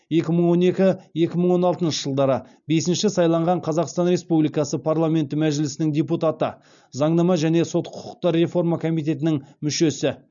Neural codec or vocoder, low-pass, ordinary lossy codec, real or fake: none; 7.2 kHz; none; real